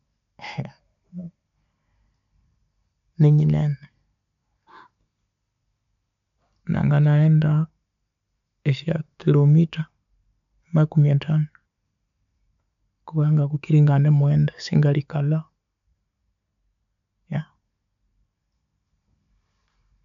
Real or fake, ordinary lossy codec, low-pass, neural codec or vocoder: real; none; 7.2 kHz; none